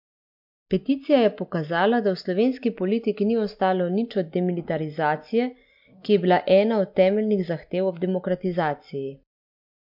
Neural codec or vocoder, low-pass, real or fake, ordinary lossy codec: none; 5.4 kHz; real; MP3, 48 kbps